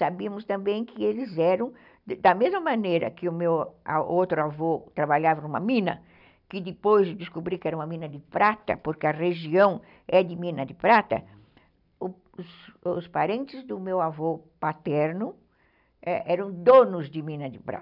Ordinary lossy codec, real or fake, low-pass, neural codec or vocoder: none; real; 5.4 kHz; none